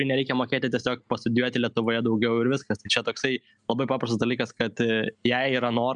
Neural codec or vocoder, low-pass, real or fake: none; 9.9 kHz; real